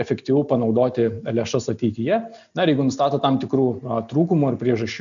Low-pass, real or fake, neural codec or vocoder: 7.2 kHz; real; none